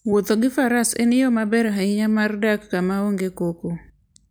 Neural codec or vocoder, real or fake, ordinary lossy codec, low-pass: none; real; none; none